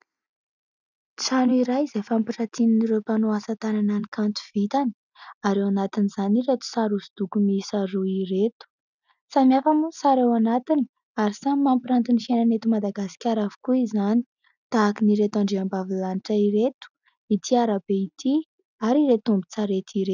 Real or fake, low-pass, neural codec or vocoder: real; 7.2 kHz; none